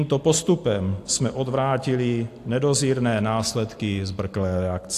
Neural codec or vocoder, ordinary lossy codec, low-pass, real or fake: none; AAC, 64 kbps; 14.4 kHz; real